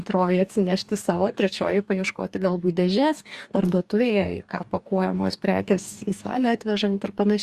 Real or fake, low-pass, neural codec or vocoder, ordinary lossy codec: fake; 14.4 kHz; codec, 44.1 kHz, 2.6 kbps, DAC; Opus, 64 kbps